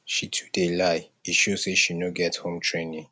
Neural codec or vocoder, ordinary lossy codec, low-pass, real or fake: none; none; none; real